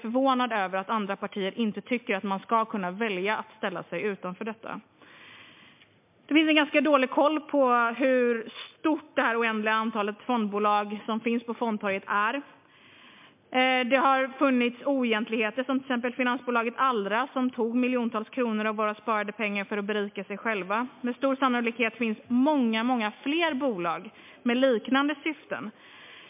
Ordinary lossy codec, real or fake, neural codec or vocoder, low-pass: MP3, 32 kbps; real; none; 3.6 kHz